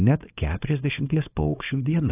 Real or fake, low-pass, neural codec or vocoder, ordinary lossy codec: fake; 3.6 kHz; codec, 24 kHz, 0.9 kbps, WavTokenizer, small release; AAC, 16 kbps